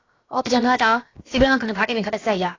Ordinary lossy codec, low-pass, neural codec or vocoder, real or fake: AAC, 32 kbps; 7.2 kHz; codec, 16 kHz, about 1 kbps, DyCAST, with the encoder's durations; fake